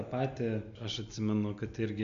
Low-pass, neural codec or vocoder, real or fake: 7.2 kHz; none; real